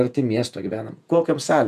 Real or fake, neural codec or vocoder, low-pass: fake; vocoder, 48 kHz, 128 mel bands, Vocos; 14.4 kHz